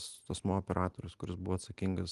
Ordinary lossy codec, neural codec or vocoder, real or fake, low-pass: Opus, 24 kbps; none; real; 10.8 kHz